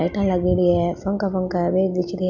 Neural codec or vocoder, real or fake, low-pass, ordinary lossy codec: none; real; 7.2 kHz; none